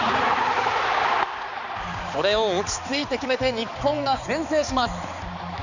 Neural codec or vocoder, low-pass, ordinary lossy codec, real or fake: codec, 16 kHz, 4 kbps, X-Codec, HuBERT features, trained on balanced general audio; 7.2 kHz; none; fake